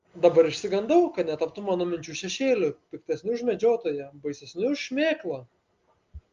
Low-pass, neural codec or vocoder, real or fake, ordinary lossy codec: 7.2 kHz; none; real; Opus, 32 kbps